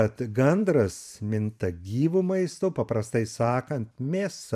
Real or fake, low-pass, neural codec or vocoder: fake; 14.4 kHz; vocoder, 44.1 kHz, 128 mel bands every 512 samples, BigVGAN v2